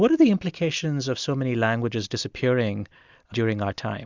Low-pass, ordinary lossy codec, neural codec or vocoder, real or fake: 7.2 kHz; Opus, 64 kbps; none; real